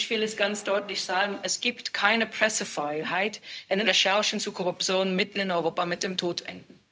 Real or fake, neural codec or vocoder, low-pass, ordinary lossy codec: fake; codec, 16 kHz, 0.4 kbps, LongCat-Audio-Codec; none; none